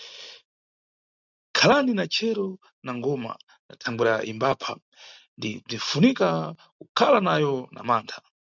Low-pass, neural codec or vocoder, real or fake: 7.2 kHz; none; real